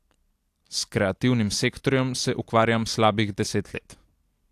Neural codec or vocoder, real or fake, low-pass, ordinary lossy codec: none; real; 14.4 kHz; AAC, 64 kbps